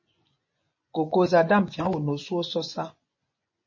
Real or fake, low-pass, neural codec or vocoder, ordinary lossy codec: fake; 7.2 kHz; vocoder, 24 kHz, 100 mel bands, Vocos; MP3, 32 kbps